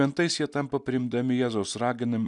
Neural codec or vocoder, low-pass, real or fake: none; 10.8 kHz; real